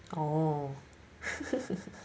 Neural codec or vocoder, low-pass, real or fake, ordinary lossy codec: none; none; real; none